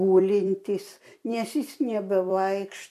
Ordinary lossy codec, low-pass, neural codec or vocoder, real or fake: MP3, 64 kbps; 14.4 kHz; vocoder, 44.1 kHz, 128 mel bands every 256 samples, BigVGAN v2; fake